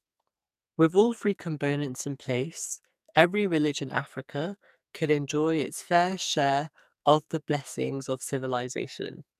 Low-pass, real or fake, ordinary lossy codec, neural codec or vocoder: 14.4 kHz; fake; none; codec, 44.1 kHz, 2.6 kbps, SNAC